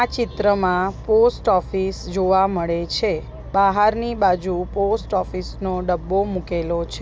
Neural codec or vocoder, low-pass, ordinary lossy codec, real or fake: none; none; none; real